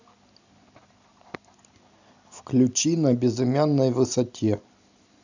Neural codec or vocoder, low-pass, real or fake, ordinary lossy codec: none; 7.2 kHz; real; none